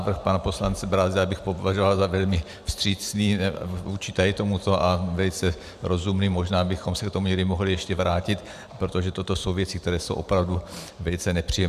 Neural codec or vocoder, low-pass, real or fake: vocoder, 44.1 kHz, 128 mel bands every 512 samples, BigVGAN v2; 14.4 kHz; fake